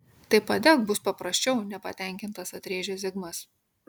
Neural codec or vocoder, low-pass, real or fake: none; 19.8 kHz; real